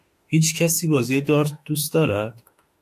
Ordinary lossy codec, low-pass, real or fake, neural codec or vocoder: AAC, 64 kbps; 14.4 kHz; fake; autoencoder, 48 kHz, 32 numbers a frame, DAC-VAE, trained on Japanese speech